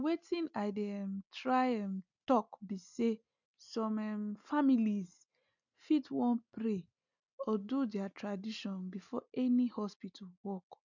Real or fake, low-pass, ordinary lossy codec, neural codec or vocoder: real; 7.2 kHz; none; none